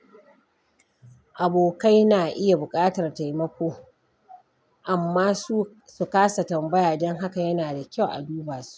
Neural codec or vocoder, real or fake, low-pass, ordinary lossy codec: none; real; none; none